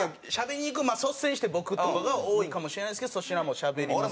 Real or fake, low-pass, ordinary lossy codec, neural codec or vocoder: real; none; none; none